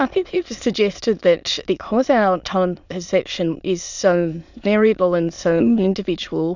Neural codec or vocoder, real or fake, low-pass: autoencoder, 22.05 kHz, a latent of 192 numbers a frame, VITS, trained on many speakers; fake; 7.2 kHz